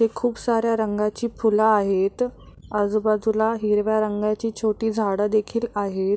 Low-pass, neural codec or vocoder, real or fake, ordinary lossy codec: none; none; real; none